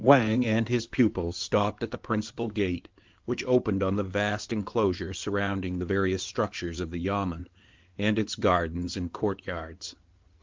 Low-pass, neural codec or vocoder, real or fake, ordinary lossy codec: 7.2 kHz; codec, 16 kHz in and 24 kHz out, 2.2 kbps, FireRedTTS-2 codec; fake; Opus, 32 kbps